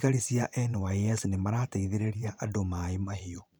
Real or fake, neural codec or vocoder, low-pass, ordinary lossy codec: fake; vocoder, 44.1 kHz, 128 mel bands every 512 samples, BigVGAN v2; none; none